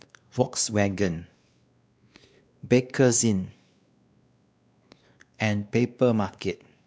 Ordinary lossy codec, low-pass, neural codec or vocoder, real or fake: none; none; codec, 16 kHz, 2 kbps, X-Codec, WavLM features, trained on Multilingual LibriSpeech; fake